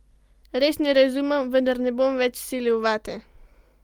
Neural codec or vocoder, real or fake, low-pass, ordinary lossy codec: none; real; 19.8 kHz; Opus, 32 kbps